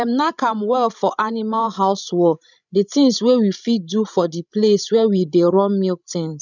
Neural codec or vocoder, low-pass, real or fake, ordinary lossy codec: codec, 16 kHz, 16 kbps, FreqCodec, larger model; 7.2 kHz; fake; none